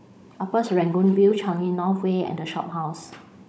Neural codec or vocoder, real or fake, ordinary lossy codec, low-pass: codec, 16 kHz, 16 kbps, FunCodec, trained on Chinese and English, 50 frames a second; fake; none; none